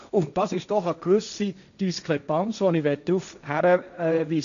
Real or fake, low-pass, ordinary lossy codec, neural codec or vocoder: fake; 7.2 kHz; none; codec, 16 kHz, 1.1 kbps, Voila-Tokenizer